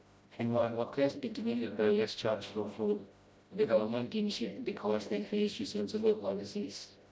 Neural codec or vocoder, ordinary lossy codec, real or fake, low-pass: codec, 16 kHz, 0.5 kbps, FreqCodec, smaller model; none; fake; none